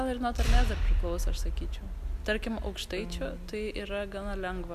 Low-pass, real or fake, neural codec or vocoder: 14.4 kHz; real; none